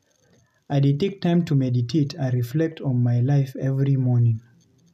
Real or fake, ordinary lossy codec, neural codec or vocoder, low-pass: real; none; none; 14.4 kHz